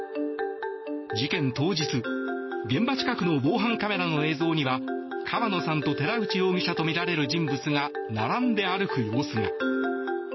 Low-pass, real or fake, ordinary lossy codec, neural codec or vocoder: 7.2 kHz; real; MP3, 24 kbps; none